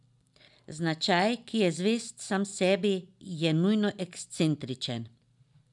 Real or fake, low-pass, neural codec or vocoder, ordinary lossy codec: real; 10.8 kHz; none; none